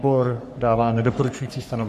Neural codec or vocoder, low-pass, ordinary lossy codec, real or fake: codec, 44.1 kHz, 3.4 kbps, Pupu-Codec; 14.4 kHz; MP3, 64 kbps; fake